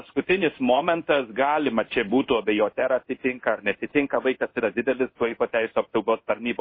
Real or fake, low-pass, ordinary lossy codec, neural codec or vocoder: fake; 5.4 kHz; MP3, 24 kbps; codec, 16 kHz in and 24 kHz out, 1 kbps, XY-Tokenizer